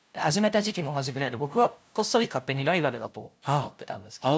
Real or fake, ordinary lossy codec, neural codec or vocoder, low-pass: fake; none; codec, 16 kHz, 0.5 kbps, FunCodec, trained on LibriTTS, 25 frames a second; none